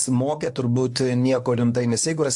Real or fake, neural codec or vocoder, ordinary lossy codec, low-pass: fake; codec, 24 kHz, 0.9 kbps, WavTokenizer, medium speech release version 1; AAC, 64 kbps; 10.8 kHz